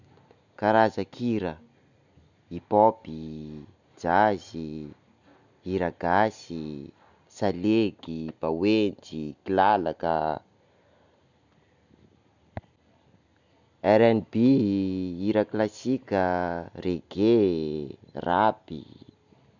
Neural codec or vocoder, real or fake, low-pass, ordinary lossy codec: none; real; 7.2 kHz; none